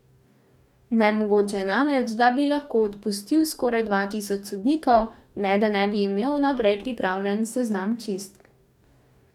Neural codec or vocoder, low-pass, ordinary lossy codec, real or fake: codec, 44.1 kHz, 2.6 kbps, DAC; 19.8 kHz; none; fake